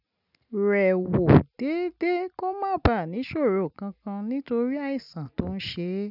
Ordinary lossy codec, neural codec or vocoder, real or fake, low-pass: none; none; real; 5.4 kHz